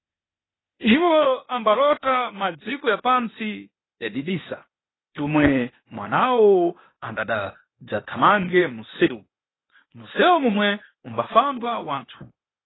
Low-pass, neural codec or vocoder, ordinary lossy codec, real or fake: 7.2 kHz; codec, 16 kHz, 0.8 kbps, ZipCodec; AAC, 16 kbps; fake